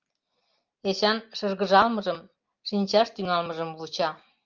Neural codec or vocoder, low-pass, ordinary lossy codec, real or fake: none; 7.2 kHz; Opus, 24 kbps; real